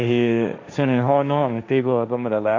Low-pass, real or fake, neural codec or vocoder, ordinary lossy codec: 7.2 kHz; fake; codec, 16 kHz, 1.1 kbps, Voila-Tokenizer; AAC, 48 kbps